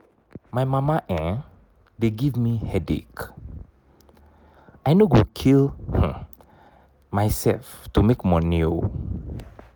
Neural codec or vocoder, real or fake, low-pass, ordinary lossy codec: none; real; none; none